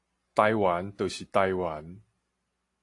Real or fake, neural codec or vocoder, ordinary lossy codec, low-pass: real; none; AAC, 48 kbps; 10.8 kHz